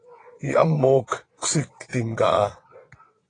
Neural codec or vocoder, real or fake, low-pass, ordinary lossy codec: vocoder, 22.05 kHz, 80 mel bands, WaveNeXt; fake; 9.9 kHz; AAC, 32 kbps